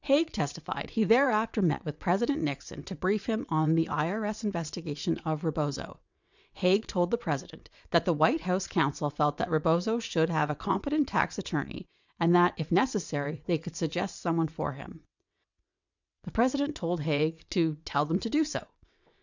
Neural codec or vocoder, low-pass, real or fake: vocoder, 22.05 kHz, 80 mel bands, WaveNeXt; 7.2 kHz; fake